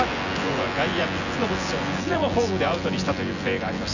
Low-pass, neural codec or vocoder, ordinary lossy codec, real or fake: 7.2 kHz; vocoder, 24 kHz, 100 mel bands, Vocos; none; fake